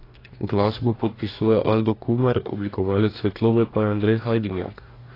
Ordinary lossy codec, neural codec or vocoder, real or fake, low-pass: AAC, 24 kbps; codec, 44.1 kHz, 2.6 kbps, DAC; fake; 5.4 kHz